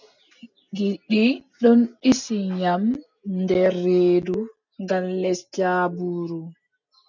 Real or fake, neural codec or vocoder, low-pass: real; none; 7.2 kHz